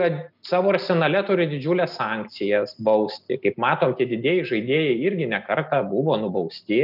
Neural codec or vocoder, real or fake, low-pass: none; real; 5.4 kHz